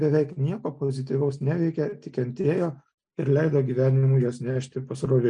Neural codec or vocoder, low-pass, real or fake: none; 9.9 kHz; real